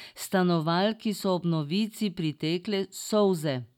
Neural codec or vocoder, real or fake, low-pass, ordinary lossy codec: none; real; 19.8 kHz; none